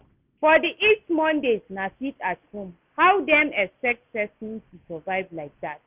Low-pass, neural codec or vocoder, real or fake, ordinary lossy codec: 3.6 kHz; none; real; Opus, 64 kbps